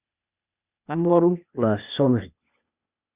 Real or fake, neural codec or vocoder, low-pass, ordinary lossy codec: fake; codec, 16 kHz, 0.8 kbps, ZipCodec; 3.6 kHz; Opus, 64 kbps